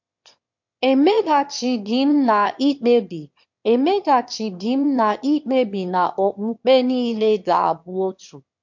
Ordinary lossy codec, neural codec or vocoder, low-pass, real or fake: MP3, 48 kbps; autoencoder, 22.05 kHz, a latent of 192 numbers a frame, VITS, trained on one speaker; 7.2 kHz; fake